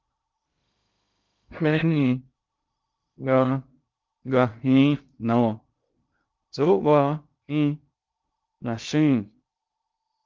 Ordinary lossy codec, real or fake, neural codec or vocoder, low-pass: Opus, 24 kbps; fake; codec, 16 kHz in and 24 kHz out, 0.6 kbps, FocalCodec, streaming, 2048 codes; 7.2 kHz